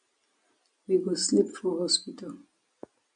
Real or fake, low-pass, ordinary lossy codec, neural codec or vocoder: real; 9.9 kHz; MP3, 64 kbps; none